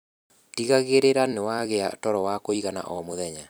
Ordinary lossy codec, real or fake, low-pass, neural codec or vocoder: none; fake; none; vocoder, 44.1 kHz, 128 mel bands every 256 samples, BigVGAN v2